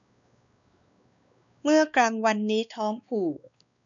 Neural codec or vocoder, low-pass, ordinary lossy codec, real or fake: codec, 16 kHz, 2 kbps, X-Codec, WavLM features, trained on Multilingual LibriSpeech; 7.2 kHz; none; fake